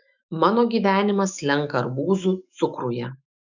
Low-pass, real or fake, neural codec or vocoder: 7.2 kHz; fake; autoencoder, 48 kHz, 128 numbers a frame, DAC-VAE, trained on Japanese speech